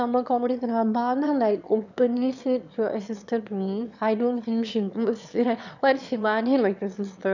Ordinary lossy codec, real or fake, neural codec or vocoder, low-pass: none; fake; autoencoder, 22.05 kHz, a latent of 192 numbers a frame, VITS, trained on one speaker; 7.2 kHz